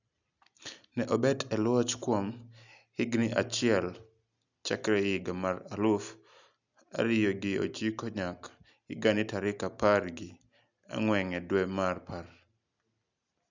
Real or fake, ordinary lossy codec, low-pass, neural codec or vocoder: real; none; 7.2 kHz; none